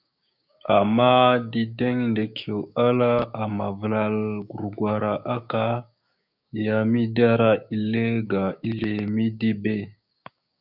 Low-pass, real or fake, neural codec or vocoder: 5.4 kHz; fake; codec, 16 kHz, 6 kbps, DAC